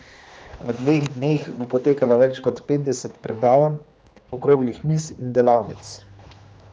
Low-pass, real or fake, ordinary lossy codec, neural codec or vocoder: none; fake; none; codec, 16 kHz, 2 kbps, X-Codec, HuBERT features, trained on general audio